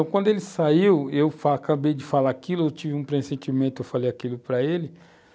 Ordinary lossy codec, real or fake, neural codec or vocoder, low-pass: none; real; none; none